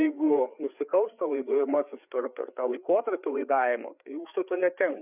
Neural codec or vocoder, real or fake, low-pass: codec, 16 kHz, 4 kbps, FreqCodec, larger model; fake; 3.6 kHz